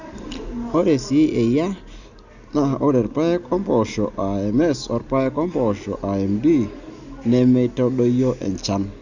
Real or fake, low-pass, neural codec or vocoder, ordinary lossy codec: real; 7.2 kHz; none; Opus, 64 kbps